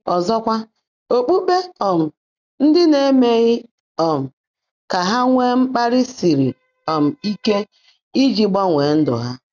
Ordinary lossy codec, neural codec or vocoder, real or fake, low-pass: none; none; real; 7.2 kHz